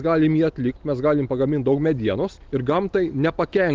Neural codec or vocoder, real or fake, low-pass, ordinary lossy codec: none; real; 7.2 kHz; Opus, 32 kbps